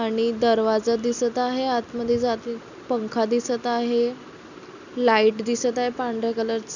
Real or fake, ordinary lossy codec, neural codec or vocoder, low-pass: real; none; none; 7.2 kHz